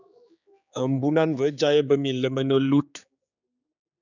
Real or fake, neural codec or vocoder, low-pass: fake; codec, 16 kHz, 4 kbps, X-Codec, HuBERT features, trained on general audio; 7.2 kHz